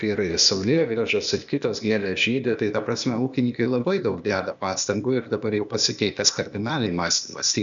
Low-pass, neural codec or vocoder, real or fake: 7.2 kHz; codec, 16 kHz, 0.8 kbps, ZipCodec; fake